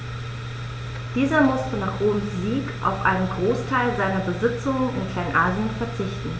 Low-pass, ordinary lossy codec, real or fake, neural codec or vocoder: none; none; real; none